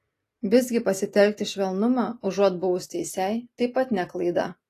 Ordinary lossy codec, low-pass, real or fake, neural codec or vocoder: AAC, 48 kbps; 14.4 kHz; real; none